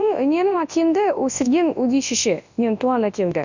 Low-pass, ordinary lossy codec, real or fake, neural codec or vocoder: 7.2 kHz; none; fake; codec, 24 kHz, 0.9 kbps, WavTokenizer, large speech release